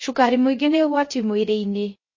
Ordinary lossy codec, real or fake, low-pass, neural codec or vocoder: MP3, 32 kbps; fake; 7.2 kHz; codec, 16 kHz, 0.3 kbps, FocalCodec